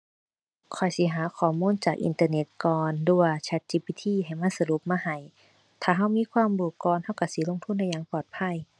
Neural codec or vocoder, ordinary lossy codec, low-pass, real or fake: none; none; 9.9 kHz; real